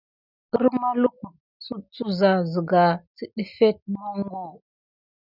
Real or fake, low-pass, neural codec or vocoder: real; 5.4 kHz; none